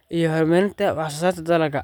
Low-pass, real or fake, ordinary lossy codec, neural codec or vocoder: 19.8 kHz; real; none; none